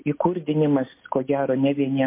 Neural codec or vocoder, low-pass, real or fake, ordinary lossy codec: none; 3.6 kHz; real; MP3, 24 kbps